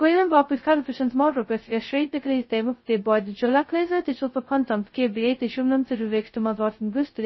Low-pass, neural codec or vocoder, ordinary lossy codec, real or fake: 7.2 kHz; codec, 16 kHz, 0.2 kbps, FocalCodec; MP3, 24 kbps; fake